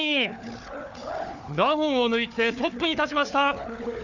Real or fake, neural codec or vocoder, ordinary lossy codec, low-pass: fake; codec, 16 kHz, 4 kbps, FunCodec, trained on Chinese and English, 50 frames a second; none; 7.2 kHz